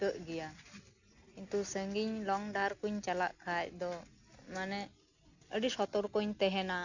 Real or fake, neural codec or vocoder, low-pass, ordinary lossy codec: real; none; 7.2 kHz; none